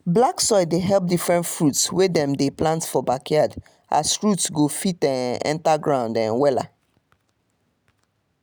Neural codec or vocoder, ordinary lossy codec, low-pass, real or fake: none; none; none; real